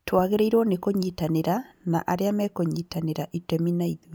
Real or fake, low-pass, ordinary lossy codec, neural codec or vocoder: real; none; none; none